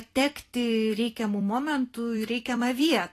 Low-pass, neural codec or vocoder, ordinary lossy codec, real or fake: 14.4 kHz; vocoder, 44.1 kHz, 128 mel bands every 256 samples, BigVGAN v2; AAC, 48 kbps; fake